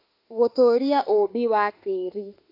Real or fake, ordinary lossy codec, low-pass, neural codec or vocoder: fake; AAC, 32 kbps; 5.4 kHz; autoencoder, 48 kHz, 32 numbers a frame, DAC-VAE, trained on Japanese speech